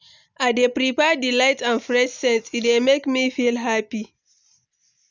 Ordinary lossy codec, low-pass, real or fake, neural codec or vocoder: none; 7.2 kHz; real; none